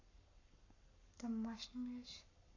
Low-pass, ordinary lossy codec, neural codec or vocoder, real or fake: 7.2 kHz; none; codec, 44.1 kHz, 7.8 kbps, Pupu-Codec; fake